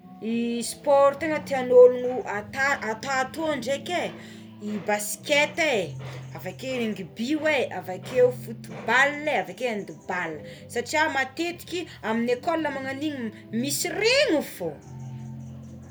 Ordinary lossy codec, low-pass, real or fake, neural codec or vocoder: none; none; real; none